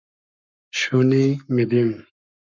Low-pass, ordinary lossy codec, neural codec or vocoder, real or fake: 7.2 kHz; MP3, 64 kbps; codec, 44.1 kHz, 7.8 kbps, Pupu-Codec; fake